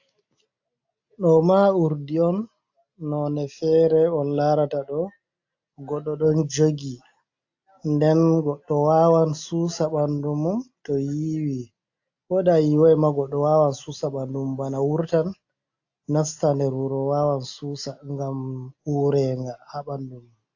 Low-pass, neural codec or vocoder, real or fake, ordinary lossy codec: 7.2 kHz; none; real; AAC, 48 kbps